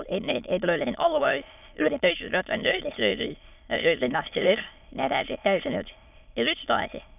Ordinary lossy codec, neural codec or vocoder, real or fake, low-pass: none; autoencoder, 22.05 kHz, a latent of 192 numbers a frame, VITS, trained on many speakers; fake; 3.6 kHz